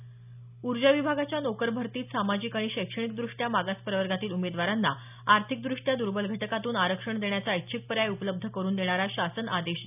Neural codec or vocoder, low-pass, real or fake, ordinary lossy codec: none; 3.6 kHz; real; none